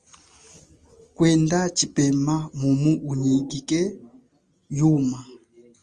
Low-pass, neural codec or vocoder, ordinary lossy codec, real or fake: 9.9 kHz; none; Opus, 32 kbps; real